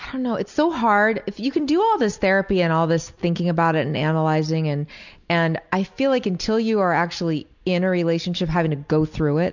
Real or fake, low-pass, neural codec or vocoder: real; 7.2 kHz; none